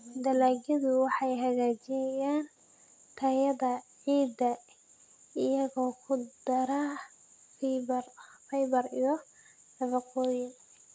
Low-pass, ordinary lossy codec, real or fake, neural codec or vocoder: none; none; real; none